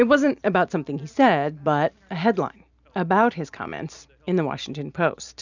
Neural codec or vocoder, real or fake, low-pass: none; real; 7.2 kHz